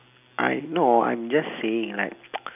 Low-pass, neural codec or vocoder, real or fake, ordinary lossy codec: 3.6 kHz; none; real; none